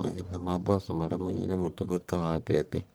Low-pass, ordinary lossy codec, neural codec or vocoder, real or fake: none; none; codec, 44.1 kHz, 1.7 kbps, Pupu-Codec; fake